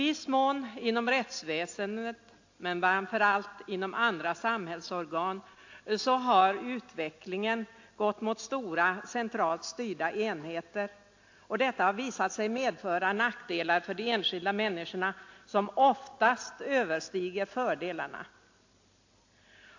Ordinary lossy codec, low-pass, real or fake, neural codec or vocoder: AAC, 48 kbps; 7.2 kHz; real; none